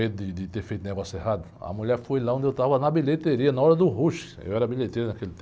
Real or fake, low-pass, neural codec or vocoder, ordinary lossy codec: real; none; none; none